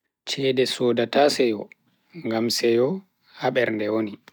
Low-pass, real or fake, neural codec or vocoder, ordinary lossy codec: 19.8 kHz; real; none; none